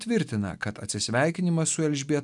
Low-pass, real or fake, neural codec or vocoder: 10.8 kHz; real; none